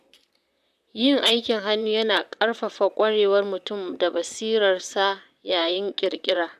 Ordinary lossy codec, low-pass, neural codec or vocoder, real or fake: none; 14.4 kHz; vocoder, 44.1 kHz, 128 mel bands, Pupu-Vocoder; fake